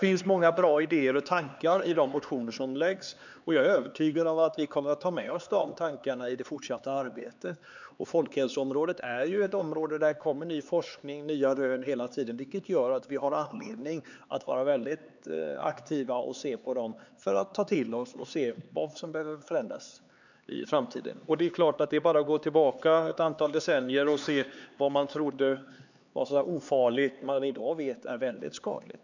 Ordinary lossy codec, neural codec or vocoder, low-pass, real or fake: none; codec, 16 kHz, 4 kbps, X-Codec, HuBERT features, trained on LibriSpeech; 7.2 kHz; fake